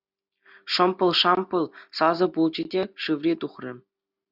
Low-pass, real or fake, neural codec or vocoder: 5.4 kHz; real; none